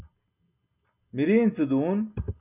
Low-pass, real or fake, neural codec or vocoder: 3.6 kHz; real; none